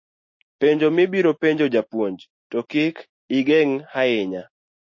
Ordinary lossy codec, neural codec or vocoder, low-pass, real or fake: MP3, 32 kbps; none; 7.2 kHz; real